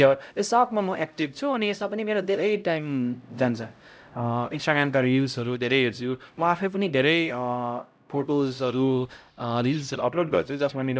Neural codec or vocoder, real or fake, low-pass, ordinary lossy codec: codec, 16 kHz, 0.5 kbps, X-Codec, HuBERT features, trained on LibriSpeech; fake; none; none